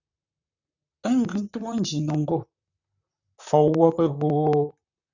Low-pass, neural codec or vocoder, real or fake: 7.2 kHz; vocoder, 44.1 kHz, 128 mel bands, Pupu-Vocoder; fake